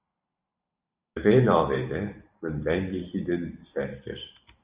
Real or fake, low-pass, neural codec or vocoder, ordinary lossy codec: fake; 3.6 kHz; vocoder, 44.1 kHz, 128 mel bands every 512 samples, BigVGAN v2; Opus, 64 kbps